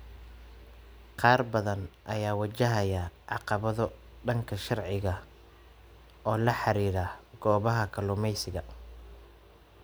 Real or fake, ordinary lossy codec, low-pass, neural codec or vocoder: real; none; none; none